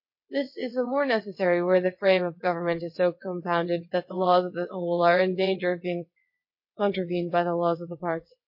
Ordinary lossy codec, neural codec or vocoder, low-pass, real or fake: MP3, 32 kbps; vocoder, 22.05 kHz, 80 mel bands, WaveNeXt; 5.4 kHz; fake